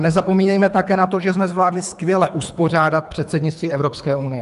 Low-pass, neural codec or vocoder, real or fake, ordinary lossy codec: 10.8 kHz; codec, 24 kHz, 3 kbps, HILCodec; fake; AAC, 96 kbps